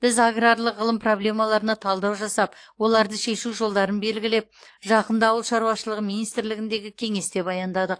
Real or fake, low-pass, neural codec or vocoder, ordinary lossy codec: fake; 9.9 kHz; codec, 44.1 kHz, 7.8 kbps, DAC; AAC, 48 kbps